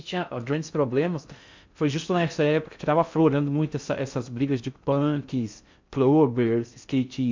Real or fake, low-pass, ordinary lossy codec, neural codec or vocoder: fake; 7.2 kHz; MP3, 64 kbps; codec, 16 kHz in and 24 kHz out, 0.6 kbps, FocalCodec, streaming, 2048 codes